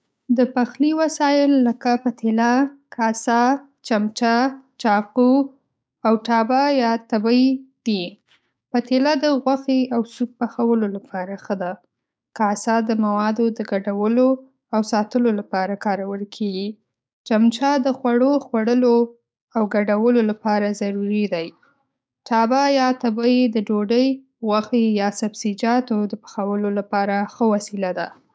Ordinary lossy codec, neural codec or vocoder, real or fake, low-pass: none; codec, 16 kHz, 6 kbps, DAC; fake; none